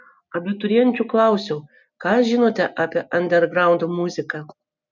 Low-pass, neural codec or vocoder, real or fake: 7.2 kHz; none; real